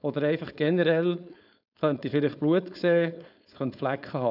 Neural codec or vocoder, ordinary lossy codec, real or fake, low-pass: codec, 16 kHz, 4.8 kbps, FACodec; none; fake; 5.4 kHz